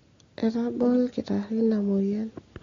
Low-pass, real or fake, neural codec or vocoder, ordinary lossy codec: 7.2 kHz; real; none; AAC, 32 kbps